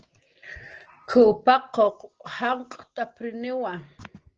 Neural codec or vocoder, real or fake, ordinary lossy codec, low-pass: none; real; Opus, 16 kbps; 7.2 kHz